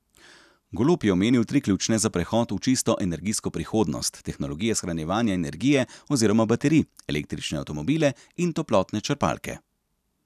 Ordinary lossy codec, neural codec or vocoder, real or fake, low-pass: none; none; real; 14.4 kHz